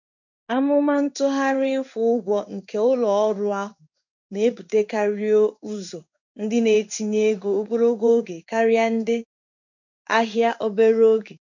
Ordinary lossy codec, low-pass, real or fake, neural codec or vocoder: none; 7.2 kHz; fake; codec, 16 kHz in and 24 kHz out, 1 kbps, XY-Tokenizer